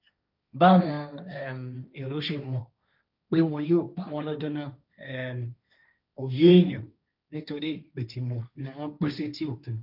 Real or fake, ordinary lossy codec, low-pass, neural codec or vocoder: fake; none; 5.4 kHz; codec, 16 kHz, 1.1 kbps, Voila-Tokenizer